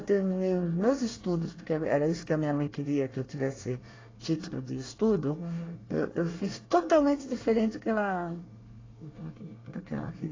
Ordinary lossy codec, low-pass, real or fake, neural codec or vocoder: AAC, 32 kbps; 7.2 kHz; fake; codec, 24 kHz, 1 kbps, SNAC